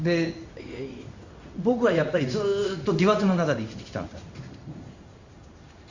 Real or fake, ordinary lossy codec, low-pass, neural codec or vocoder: fake; Opus, 64 kbps; 7.2 kHz; codec, 16 kHz in and 24 kHz out, 1 kbps, XY-Tokenizer